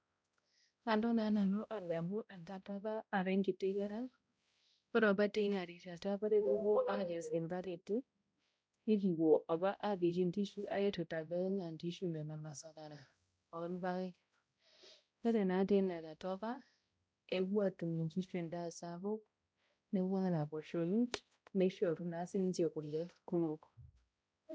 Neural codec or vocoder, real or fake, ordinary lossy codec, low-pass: codec, 16 kHz, 0.5 kbps, X-Codec, HuBERT features, trained on balanced general audio; fake; none; none